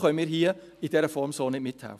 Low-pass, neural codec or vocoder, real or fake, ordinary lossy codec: 14.4 kHz; none; real; MP3, 96 kbps